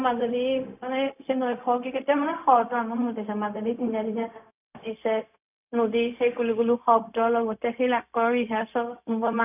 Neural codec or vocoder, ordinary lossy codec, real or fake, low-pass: codec, 16 kHz, 0.4 kbps, LongCat-Audio-Codec; none; fake; 3.6 kHz